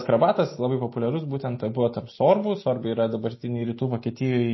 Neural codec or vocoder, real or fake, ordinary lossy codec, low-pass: none; real; MP3, 24 kbps; 7.2 kHz